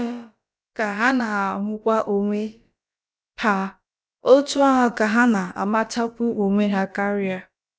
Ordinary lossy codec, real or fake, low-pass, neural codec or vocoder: none; fake; none; codec, 16 kHz, about 1 kbps, DyCAST, with the encoder's durations